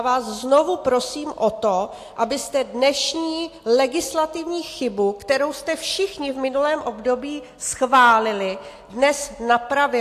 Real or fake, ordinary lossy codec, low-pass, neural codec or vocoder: real; AAC, 64 kbps; 14.4 kHz; none